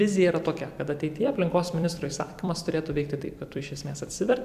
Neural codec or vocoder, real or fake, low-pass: vocoder, 44.1 kHz, 128 mel bands every 256 samples, BigVGAN v2; fake; 14.4 kHz